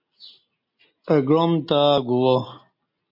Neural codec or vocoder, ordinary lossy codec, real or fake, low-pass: none; MP3, 48 kbps; real; 5.4 kHz